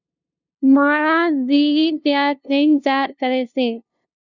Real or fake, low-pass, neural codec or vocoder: fake; 7.2 kHz; codec, 16 kHz, 0.5 kbps, FunCodec, trained on LibriTTS, 25 frames a second